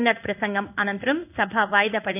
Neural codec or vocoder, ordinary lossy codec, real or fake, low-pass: codec, 16 kHz in and 24 kHz out, 1 kbps, XY-Tokenizer; none; fake; 3.6 kHz